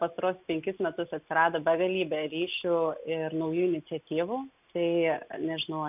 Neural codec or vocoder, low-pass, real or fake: none; 3.6 kHz; real